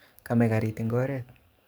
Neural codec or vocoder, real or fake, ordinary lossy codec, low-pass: codec, 44.1 kHz, 7.8 kbps, DAC; fake; none; none